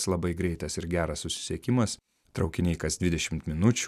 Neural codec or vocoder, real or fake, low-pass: none; real; 14.4 kHz